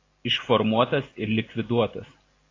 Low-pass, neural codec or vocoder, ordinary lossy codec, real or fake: 7.2 kHz; none; AAC, 32 kbps; real